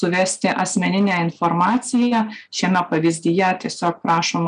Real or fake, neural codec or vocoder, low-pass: real; none; 9.9 kHz